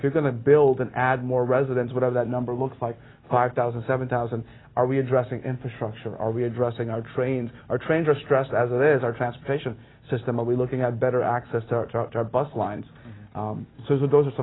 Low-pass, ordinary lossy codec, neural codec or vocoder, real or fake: 7.2 kHz; AAC, 16 kbps; none; real